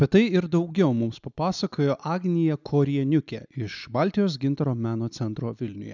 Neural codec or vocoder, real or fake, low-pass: none; real; 7.2 kHz